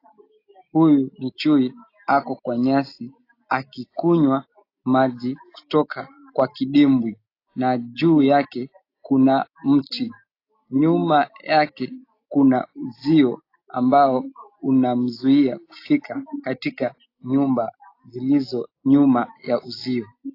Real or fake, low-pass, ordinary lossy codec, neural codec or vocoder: real; 5.4 kHz; AAC, 32 kbps; none